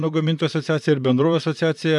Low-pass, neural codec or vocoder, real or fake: 10.8 kHz; vocoder, 44.1 kHz, 128 mel bands, Pupu-Vocoder; fake